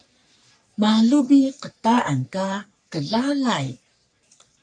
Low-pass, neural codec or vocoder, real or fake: 9.9 kHz; codec, 44.1 kHz, 3.4 kbps, Pupu-Codec; fake